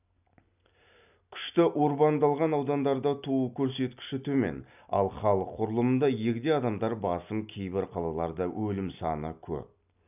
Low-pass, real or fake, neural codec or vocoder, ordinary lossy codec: 3.6 kHz; real; none; none